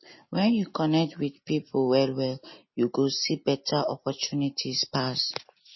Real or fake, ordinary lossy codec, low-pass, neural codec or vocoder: real; MP3, 24 kbps; 7.2 kHz; none